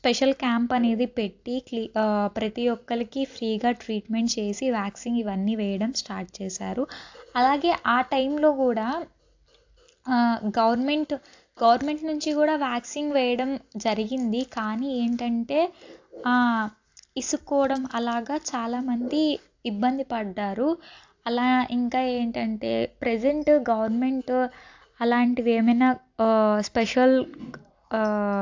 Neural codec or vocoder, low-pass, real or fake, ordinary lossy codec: none; 7.2 kHz; real; AAC, 48 kbps